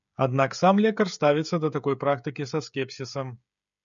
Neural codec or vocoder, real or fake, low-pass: codec, 16 kHz, 16 kbps, FreqCodec, smaller model; fake; 7.2 kHz